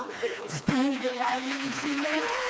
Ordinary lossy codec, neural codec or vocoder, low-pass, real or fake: none; codec, 16 kHz, 2 kbps, FreqCodec, smaller model; none; fake